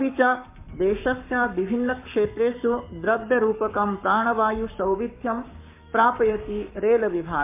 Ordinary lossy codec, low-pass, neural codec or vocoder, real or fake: MP3, 32 kbps; 3.6 kHz; codec, 44.1 kHz, 7.8 kbps, Pupu-Codec; fake